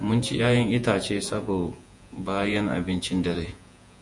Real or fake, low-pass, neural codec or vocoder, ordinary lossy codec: fake; 10.8 kHz; vocoder, 48 kHz, 128 mel bands, Vocos; MP3, 64 kbps